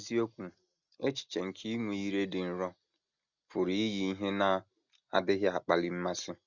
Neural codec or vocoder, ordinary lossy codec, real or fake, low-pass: none; none; real; 7.2 kHz